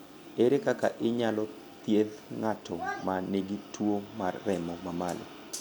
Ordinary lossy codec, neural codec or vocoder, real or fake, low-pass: none; none; real; none